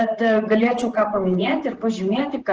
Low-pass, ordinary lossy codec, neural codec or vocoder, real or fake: 7.2 kHz; Opus, 16 kbps; vocoder, 44.1 kHz, 128 mel bands, Pupu-Vocoder; fake